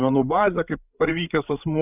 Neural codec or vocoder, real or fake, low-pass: codec, 16 kHz, 8 kbps, FreqCodec, larger model; fake; 3.6 kHz